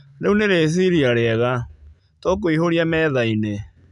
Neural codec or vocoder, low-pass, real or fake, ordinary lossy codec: none; 10.8 kHz; real; MP3, 96 kbps